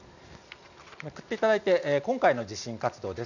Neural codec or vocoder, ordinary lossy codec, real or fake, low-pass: none; none; real; 7.2 kHz